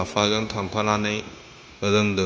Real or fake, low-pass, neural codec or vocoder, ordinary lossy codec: fake; none; codec, 16 kHz, 0.9 kbps, LongCat-Audio-Codec; none